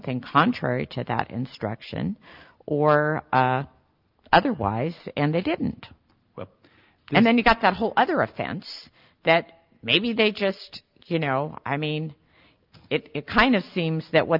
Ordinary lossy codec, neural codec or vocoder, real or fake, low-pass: Opus, 24 kbps; none; real; 5.4 kHz